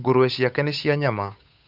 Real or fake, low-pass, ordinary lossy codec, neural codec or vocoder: fake; 5.4 kHz; none; codec, 16 kHz, 8 kbps, FunCodec, trained on Chinese and English, 25 frames a second